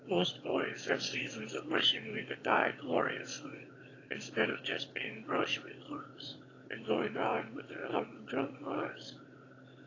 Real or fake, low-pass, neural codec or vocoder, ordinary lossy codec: fake; 7.2 kHz; autoencoder, 22.05 kHz, a latent of 192 numbers a frame, VITS, trained on one speaker; AAC, 32 kbps